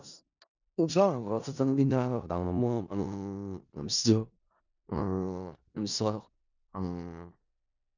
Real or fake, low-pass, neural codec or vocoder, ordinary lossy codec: fake; 7.2 kHz; codec, 16 kHz in and 24 kHz out, 0.4 kbps, LongCat-Audio-Codec, four codebook decoder; none